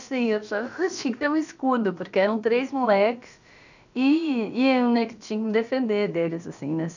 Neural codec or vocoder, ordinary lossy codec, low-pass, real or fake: codec, 16 kHz, about 1 kbps, DyCAST, with the encoder's durations; none; 7.2 kHz; fake